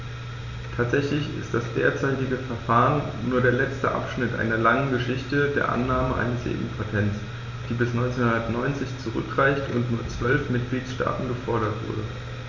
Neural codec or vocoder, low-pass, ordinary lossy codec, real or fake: none; 7.2 kHz; none; real